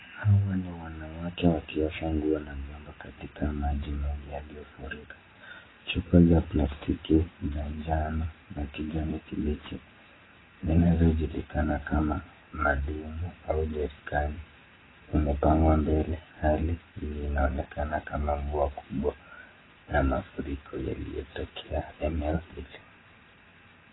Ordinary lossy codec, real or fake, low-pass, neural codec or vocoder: AAC, 16 kbps; real; 7.2 kHz; none